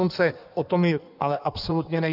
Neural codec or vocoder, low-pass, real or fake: codec, 16 kHz in and 24 kHz out, 1.1 kbps, FireRedTTS-2 codec; 5.4 kHz; fake